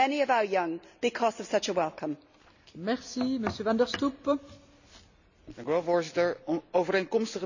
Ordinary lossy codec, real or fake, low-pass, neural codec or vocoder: none; real; 7.2 kHz; none